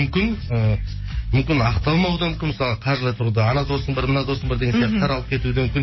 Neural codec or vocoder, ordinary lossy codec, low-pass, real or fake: codec, 44.1 kHz, 7.8 kbps, DAC; MP3, 24 kbps; 7.2 kHz; fake